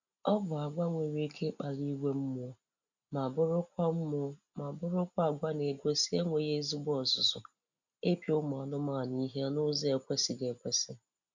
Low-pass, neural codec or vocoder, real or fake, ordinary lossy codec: 7.2 kHz; none; real; none